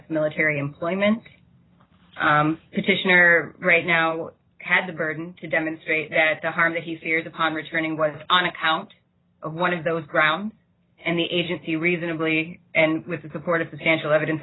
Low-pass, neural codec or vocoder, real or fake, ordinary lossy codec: 7.2 kHz; none; real; AAC, 16 kbps